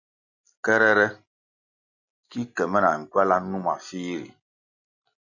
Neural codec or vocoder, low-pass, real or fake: none; 7.2 kHz; real